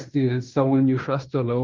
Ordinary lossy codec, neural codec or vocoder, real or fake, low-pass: Opus, 32 kbps; codec, 16 kHz in and 24 kHz out, 0.9 kbps, LongCat-Audio-Codec, fine tuned four codebook decoder; fake; 7.2 kHz